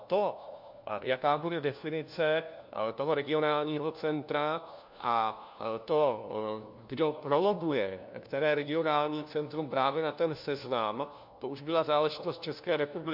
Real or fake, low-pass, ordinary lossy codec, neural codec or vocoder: fake; 5.4 kHz; MP3, 48 kbps; codec, 16 kHz, 1 kbps, FunCodec, trained on LibriTTS, 50 frames a second